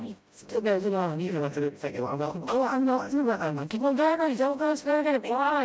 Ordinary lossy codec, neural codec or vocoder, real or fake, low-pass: none; codec, 16 kHz, 0.5 kbps, FreqCodec, smaller model; fake; none